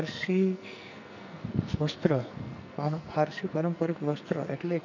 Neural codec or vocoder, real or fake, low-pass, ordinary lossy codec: codec, 44.1 kHz, 2.6 kbps, SNAC; fake; 7.2 kHz; none